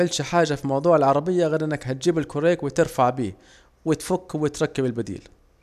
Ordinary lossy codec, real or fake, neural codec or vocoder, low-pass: none; real; none; 14.4 kHz